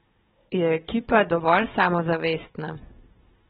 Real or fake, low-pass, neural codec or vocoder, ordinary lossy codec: fake; 7.2 kHz; codec, 16 kHz, 16 kbps, FunCodec, trained on Chinese and English, 50 frames a second; AAC, 16 kbps